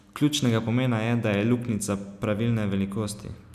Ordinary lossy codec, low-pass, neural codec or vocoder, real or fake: none; 14.4 kHz; none; real